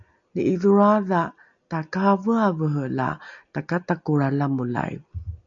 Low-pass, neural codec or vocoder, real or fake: 7.2 kHz; none; real